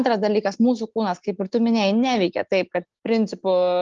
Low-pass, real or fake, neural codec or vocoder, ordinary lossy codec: 7.2 kHz; real; none; Opus, 32 kbps